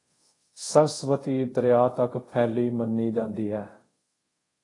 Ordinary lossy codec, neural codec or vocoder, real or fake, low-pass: AAC, 32 kbps; codec, 24 kHz, 0.5 kbps, DualCodec; fake; 10.8 kHz